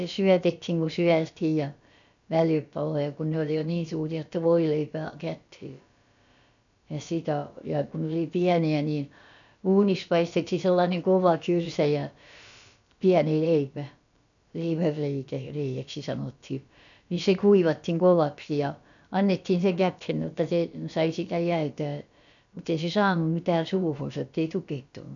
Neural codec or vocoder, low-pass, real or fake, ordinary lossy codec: codec, 16 kHz, about 1 kbps, DyCAST, with the encoder's durations; 7.2 kHz; fake; none